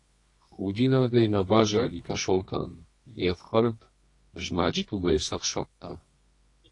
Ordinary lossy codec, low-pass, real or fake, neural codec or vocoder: AAC, 48 kbps; 10.8 kHz; fake; codec, 24 kHz, 0.9 kbps, WavTokenizer, medium music audio release